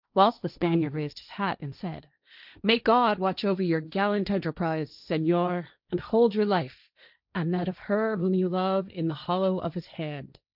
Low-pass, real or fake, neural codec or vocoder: 5.4 kHz; fake; codec, 16 kHz, 1.1 kbps, Voila-Tokenizer